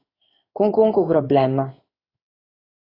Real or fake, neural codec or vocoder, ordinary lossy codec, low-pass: fake; codec, 16 kHz in and 24 kHz out, 1 kbps, XY-Tokenizer; AAC, 24 kbps; 5.4 kHz